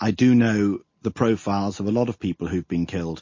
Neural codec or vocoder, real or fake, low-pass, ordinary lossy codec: none; real; 7.2 kHz; MP3, 32 kbps